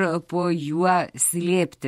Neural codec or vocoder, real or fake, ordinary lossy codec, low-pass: vocoder, 48 kHz, 128 mel bands, Vocos; fake; MP3, 64 kbps; 19.8 kHz